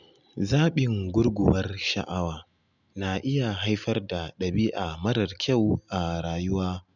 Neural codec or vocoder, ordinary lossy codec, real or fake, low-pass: none; none; real; 7.2 kHz